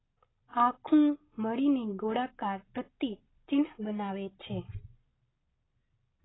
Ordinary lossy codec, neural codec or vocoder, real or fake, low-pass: AAC, 16 kbps; none; real; 7.2 kHz